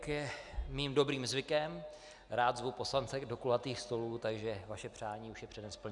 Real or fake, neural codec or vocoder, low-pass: real; none; 10.8 kHz